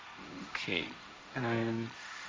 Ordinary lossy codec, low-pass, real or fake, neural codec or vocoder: none; none; fake; codec, 16 kHz, 1.1 kbps, Voila-Tokenizer